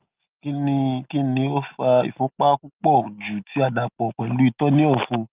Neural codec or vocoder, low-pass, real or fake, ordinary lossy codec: none; 3.6 kHz; real; Opus, 24 kbps